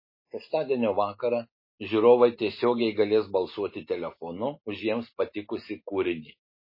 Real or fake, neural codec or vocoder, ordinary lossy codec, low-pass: real; none; MP3, 24 kbps; 5.4 kHz